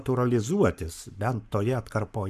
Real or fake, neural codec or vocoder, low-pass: fake; codec, 44.1 kHz, 7.8 kbps, Pupu-Codec; 14.4 kHz